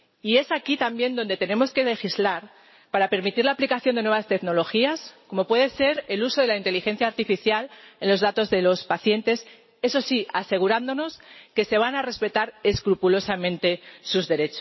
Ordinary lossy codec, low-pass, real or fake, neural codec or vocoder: MP3, 24 kbps; 7.2 kHz; real; none